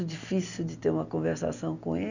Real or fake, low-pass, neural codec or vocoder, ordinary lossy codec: real; 7.2 kHz; none; none